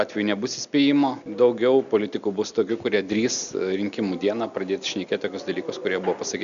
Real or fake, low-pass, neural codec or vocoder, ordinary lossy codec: real; 7.2 kHz; none; MP3, 96 kbps